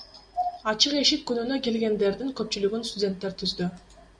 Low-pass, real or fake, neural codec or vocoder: 9.9 kHz; real; none